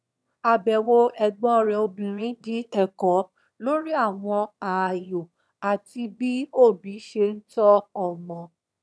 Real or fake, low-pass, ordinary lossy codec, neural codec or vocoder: fake; none; none; autoencoder, 22.05 kHz, a latent of 192 numbers a frame, VITS, trained on one speaker